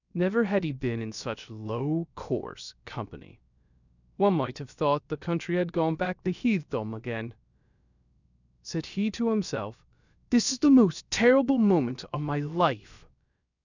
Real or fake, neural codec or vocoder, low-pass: fake; codec, 16 kHz, about 1 kbps, DyCAST, with the encoder's durations; 7.2 kHz